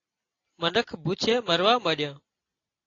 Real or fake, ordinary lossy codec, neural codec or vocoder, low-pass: real; AAC, 32 kbps; none; 7.2 kHz